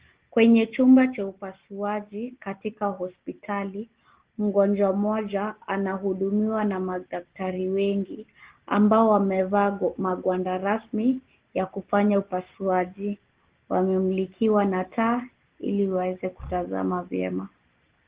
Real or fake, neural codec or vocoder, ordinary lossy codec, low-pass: real; none; Opus, 16 kbps; 3.6 kHz